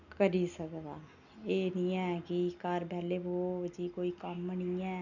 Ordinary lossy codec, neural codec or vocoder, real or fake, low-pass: none; none; real; 7.2 kHz